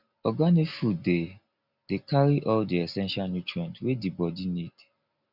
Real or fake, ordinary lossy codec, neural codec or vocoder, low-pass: real; none; none; 5.4 kHz